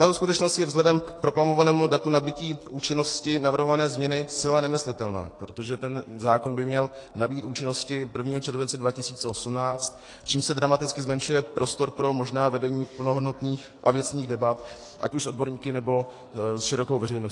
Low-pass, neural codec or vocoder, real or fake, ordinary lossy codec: 10.8 kHz; codec, 44.1 kHz, 2.6 kbps, SNAC; fake; AAC, 48 kbps